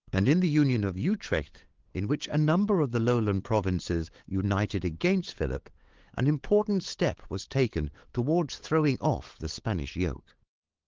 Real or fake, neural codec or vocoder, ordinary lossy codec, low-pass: fake; codec, 16 kHz, 8 kbps, FunCodec, trained on LibriTTS, 25 frames a second; Opus, 16 kbps; 7.2 kHz